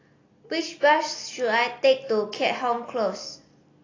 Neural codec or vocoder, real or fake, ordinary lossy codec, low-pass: none; real; AAC, 32 kbps; 7.2 kHz